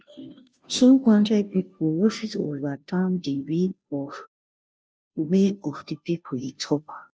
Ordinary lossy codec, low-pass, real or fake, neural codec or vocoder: none; none; fake; codec, 16 kHz, 0.5 kbps, FunCodec, trained on Chinese and English, 25 frames a second